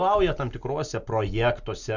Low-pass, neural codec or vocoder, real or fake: 7.2 kHz; none; real